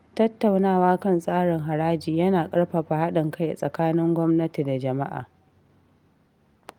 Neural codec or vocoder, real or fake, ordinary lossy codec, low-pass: none; real; Opus, 32 kbps; 19.8 kHz